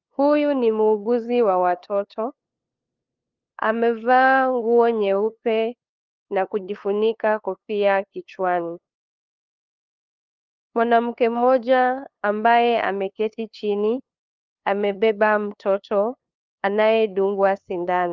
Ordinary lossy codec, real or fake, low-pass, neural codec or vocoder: Opus, 24 kbps; fake; 7.2 kHz; codec, 16 kHz, 2 kbps, FunCodec, trained on LibriTTS, 25 frames a second